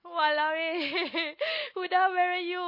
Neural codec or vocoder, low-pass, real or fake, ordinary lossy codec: none; 5.4 kHz; real; MP3, 24 kbps